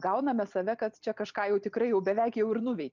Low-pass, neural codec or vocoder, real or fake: 7.2 kHz; none; real